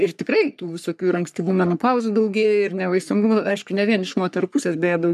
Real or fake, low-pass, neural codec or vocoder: fake; 14.4 kHz; codec, 44.1 kHz, 3.4 kbps, Pupu-Codec